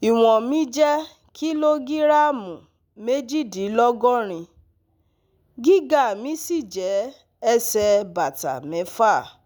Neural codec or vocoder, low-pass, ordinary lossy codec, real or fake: none; none; none; real